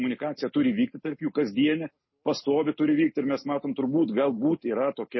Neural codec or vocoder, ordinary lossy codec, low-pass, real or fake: none; MP3, 24 kbps; 7.2 kHz; real